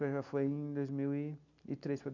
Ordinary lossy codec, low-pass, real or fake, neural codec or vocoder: none; 7.2 kHz; real; none